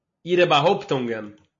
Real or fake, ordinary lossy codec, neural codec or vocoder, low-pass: real; MP3, 32 kbps; none; 7.2 kHz